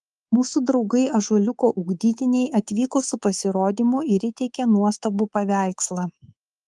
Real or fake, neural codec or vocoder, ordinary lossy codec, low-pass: fake; codec, 24 kHz, 3.1 kbps, DualCodec; Opus, 24 kbps; 10.8 kHz